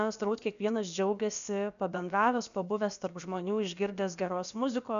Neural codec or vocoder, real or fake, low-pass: codec, 16 kHz, about 1 kbps, DyCAST, with the encoder's durations; fake; 7.2 kHz